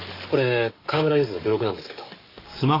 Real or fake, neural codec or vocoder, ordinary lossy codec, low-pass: fake; codec, 44.1 kHz, 7.8 kbps, DAC; AAC, 24 kbps; 5.4 kHz